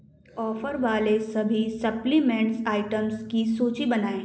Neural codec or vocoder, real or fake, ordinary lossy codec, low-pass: none; real; none; none